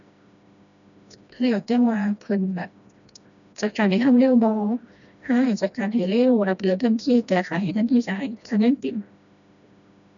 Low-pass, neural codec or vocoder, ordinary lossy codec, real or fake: 7.2 kHz; codec, 16 kHz, 1 kbps, FreqCodec, smaller model; none; fake